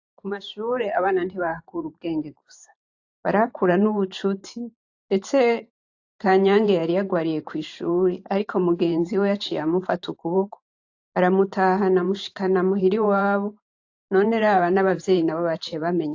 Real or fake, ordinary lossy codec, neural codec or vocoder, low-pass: fake; AAC, 48 kbps; vocoder, 44.1 kHz, 128 mel bands every 512 samples, BigVGAN v2; 7.2 kHz